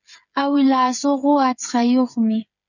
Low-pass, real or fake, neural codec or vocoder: 7.2 kHz; fake; codec, 16 kHz, 8 kbps, FreqCodec, smaller model